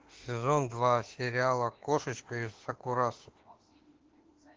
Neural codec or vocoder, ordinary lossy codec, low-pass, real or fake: autoencoder, 48 kHz, 32 numbers a frame, DAC-VAE, trained on Japanese speech; Opus, 16 kbps; 7.2 kHz; fake